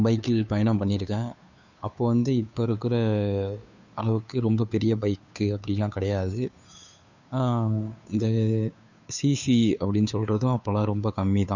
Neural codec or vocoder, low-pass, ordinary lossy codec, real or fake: codec, 16 kHz, 2 kbps, FunCodec, trained on Chinese and English, 25 frames a second; 7.2 kHz; none; fake